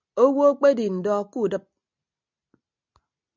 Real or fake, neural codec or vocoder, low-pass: real; none; 7.2 kHz